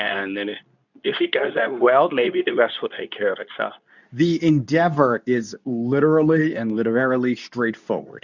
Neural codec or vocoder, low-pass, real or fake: codec, 24 kHz, 0.9 kbps, WavTokenizer, medium speech release version 2; 7.2 kHz; fake